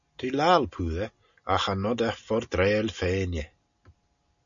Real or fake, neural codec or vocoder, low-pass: real; none; 7.2 kHz